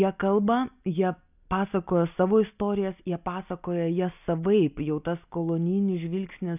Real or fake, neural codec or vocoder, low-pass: real; none; 3.6 kHz